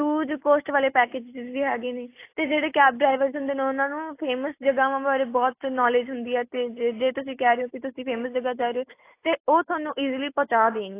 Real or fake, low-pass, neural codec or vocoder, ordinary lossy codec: real; 3.6 kHz; none; AAC, 24 kbps